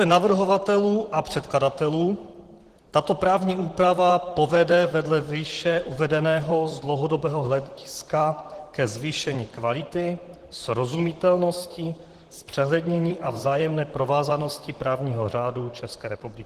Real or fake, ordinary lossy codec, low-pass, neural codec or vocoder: fake; Opus, 16 kbps; 14.4 kHz; vocoder, 44.1 kHz, 128 mel bands, Pupu-Vocoder